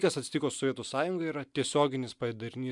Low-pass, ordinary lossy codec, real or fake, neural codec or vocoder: 10.8 kHz; MP3, 96 kbps; real; none